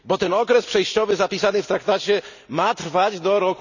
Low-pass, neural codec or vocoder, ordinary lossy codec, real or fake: 7.2 kHz; none; none; real